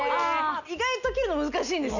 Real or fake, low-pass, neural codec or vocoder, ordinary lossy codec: real; 7.2 kHz; none; none